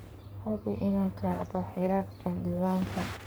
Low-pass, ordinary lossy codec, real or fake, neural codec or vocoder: none; none; fake; codec, 44.1 kHz, 3.4 kbps, Pupu-Codec